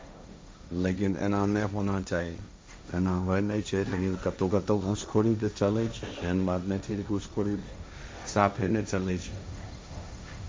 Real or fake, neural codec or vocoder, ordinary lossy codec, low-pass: fake; codec, 16 kHz, 1.1 kbps, Voila-Tokenizer; none; none